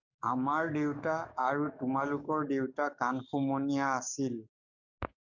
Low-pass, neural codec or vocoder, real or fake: 7.2 kHz; codec, 44.1 kHz, 7.8 kbps, DAC; fake